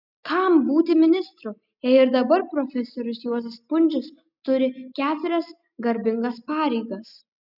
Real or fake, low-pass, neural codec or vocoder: real; 5.4 kHz; none